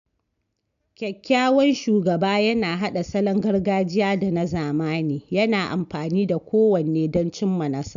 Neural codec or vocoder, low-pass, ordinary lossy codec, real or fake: none; 7.2 kHz; AAC, 96 kbps; real